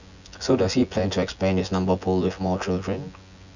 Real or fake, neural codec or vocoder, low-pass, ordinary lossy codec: fake; vocoder, 24 kHz, 100 mel bands, Vocos; 7.2 kHz; none